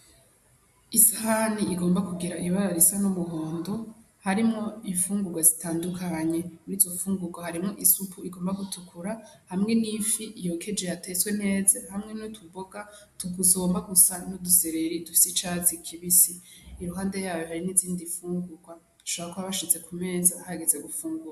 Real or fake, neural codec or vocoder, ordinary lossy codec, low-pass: real; none; AAC, 96 kbps; 14.4 kHz